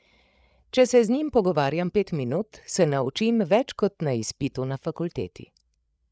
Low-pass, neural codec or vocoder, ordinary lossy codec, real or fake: none; codec, 16 kHz, 8 kbps, FreqCodec, larger model; none; fake